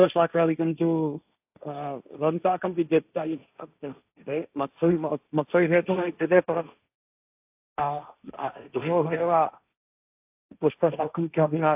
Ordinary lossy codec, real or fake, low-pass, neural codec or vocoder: AAC, 32 kbps; fake; 3.6 kHz; codec, 16 kHz, 1.1 kbps, Voila-Tokenizer